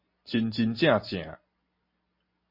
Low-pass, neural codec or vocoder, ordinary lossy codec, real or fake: 5.4 kHz; none; MP3, 32 kbps; real